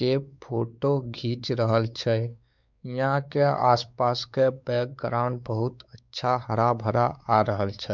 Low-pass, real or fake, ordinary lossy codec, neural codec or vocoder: 7.2 kHz; fake; none; codec, 16 kHz, 4 kbps, X-Codec, WavLM features, trained on Multilingual LibriSpeech